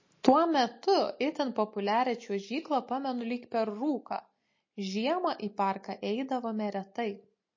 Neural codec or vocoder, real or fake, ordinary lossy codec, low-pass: none; real; MP3, 32 kbps; 7.2 kHz